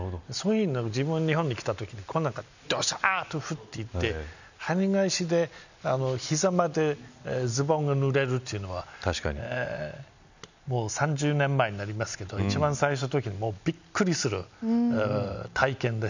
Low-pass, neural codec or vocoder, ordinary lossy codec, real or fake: 7.2 kHz; none; none; real